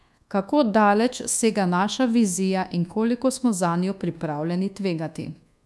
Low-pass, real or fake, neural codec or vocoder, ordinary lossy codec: none; fake; codec, 24 kHz, 1.2 kbps, DualCodec; none